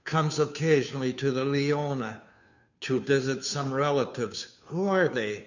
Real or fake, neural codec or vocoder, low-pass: fake; codec, 16 kHz, 2 kbps, FunCodec, trained on Chinese and English, 25 frames a second; 7.2 kHz